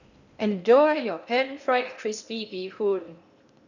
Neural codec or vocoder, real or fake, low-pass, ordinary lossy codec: codec, 16 kHz in and 24 kHz out, 0.8 kbps, FocalCodec, streaming, 65536 codes; fake; 7.2 kHz; none